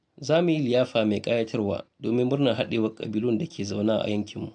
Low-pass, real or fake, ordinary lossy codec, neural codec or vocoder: 9.9 kHz; real; AAC, 64 kbps; none